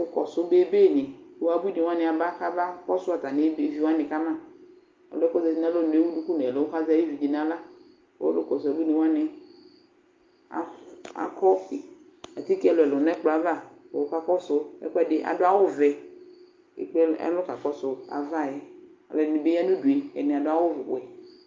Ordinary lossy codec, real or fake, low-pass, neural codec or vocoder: Opus, 24 kbps; real; 7.2 kHz; none